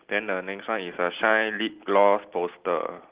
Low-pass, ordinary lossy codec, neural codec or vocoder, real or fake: 3.6 kHz; Opus, 16 kbps; none; real